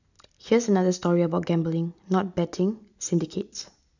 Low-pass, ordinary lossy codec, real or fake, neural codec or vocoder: 7.2 kHz; none; real; none